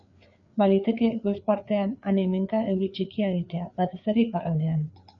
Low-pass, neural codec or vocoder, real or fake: 7.2 kHz; codec, 16 kHz, 4 kbps, FreqCodec, larger model; fake